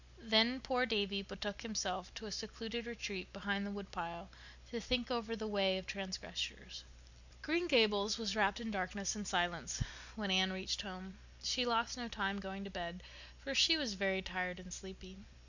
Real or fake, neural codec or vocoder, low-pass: real; none; 7.2 kHz